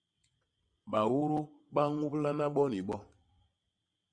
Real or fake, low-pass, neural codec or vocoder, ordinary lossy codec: fake; 9.9 kHz; vocoder, 22.05 kHz, 80 mel bands, WaveNeXt; Opus, 64 kbps